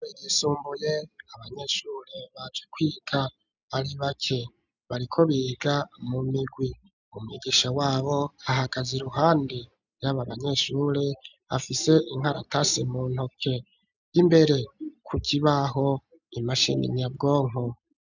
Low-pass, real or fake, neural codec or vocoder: 7.2 kHz; real; none